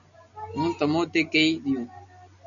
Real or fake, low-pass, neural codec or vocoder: real; 7.2 kHz; none